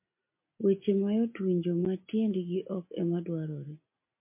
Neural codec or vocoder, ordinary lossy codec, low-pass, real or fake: none; MP3, 32 kbps; 3.6 kHz; real